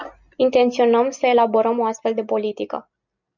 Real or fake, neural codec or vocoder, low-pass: real; none; 7.2 kHz